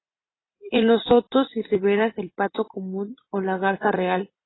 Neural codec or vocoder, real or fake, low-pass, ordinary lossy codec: vocoder, 44.1 kHz, 128 mel bands every 256 samples, BigVGAN v2; fake; 7.2 kHz; AAC, 16 kbps